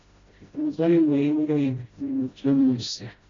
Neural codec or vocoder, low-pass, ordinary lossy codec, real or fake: codec, 16 kHz, 0.5 kbps, FreqCodec, smaller model; 7.2 kHz; AAC, 32 kbps; fake